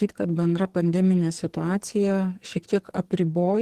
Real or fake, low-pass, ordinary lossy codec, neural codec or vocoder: fake; 14.4 kHz; Opus, 16 kbps; codec, 44.1 kHz, 2.6 kbps, SNAC